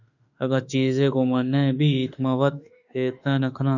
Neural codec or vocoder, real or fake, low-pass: autoencoder, 48 kHz, 32 numbers a frame, DAC-VAE, trained on Japanese speech; fake; 7.2 kHz